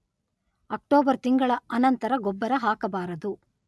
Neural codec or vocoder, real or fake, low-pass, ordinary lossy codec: none; real; none; none